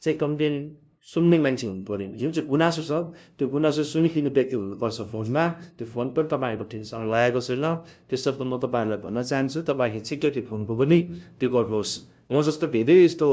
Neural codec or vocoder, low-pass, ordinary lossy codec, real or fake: codec, 16 kHz, 0.5 kbps, FunCodec, trained on LibriTTS, 25 frames a second; none; none; fake